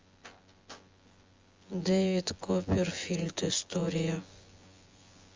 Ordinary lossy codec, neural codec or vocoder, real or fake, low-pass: Opus, 32 kbps; vocoder, 24 kHz, 100 mel bands, Vocos; fake; 7.2 kHz